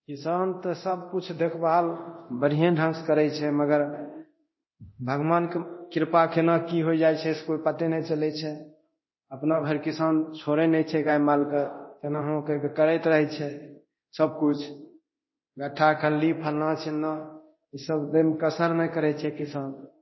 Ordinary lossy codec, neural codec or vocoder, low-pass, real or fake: MP3, 24 kbps; codec, 24 kHz, 0.9 kbps, DualCodec; 7.2 kHz; fake